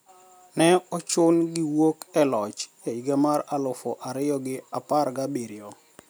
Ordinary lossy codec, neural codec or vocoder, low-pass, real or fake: none; none; none; real